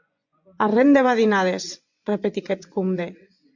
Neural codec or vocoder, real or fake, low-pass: vocoder, 24 kHz, 100 mel bands, Vocos; fake; 7.2 kHz